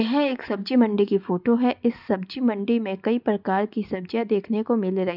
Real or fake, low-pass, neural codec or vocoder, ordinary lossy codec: real; 5.4 kHz; none; none